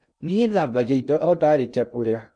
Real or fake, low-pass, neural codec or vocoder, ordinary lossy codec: fake; 9.9 kHz; codec, 16 kHz in and 24 kHz out, 0.6 kbps, FocalCodec, streaming, 2048 codes; none